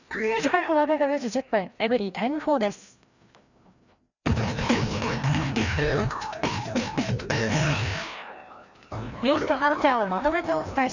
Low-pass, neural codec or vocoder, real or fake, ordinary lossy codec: 7.2 kHz; codec, 16 kHz, 1 kbps, FreqCodec, larger model; fake; none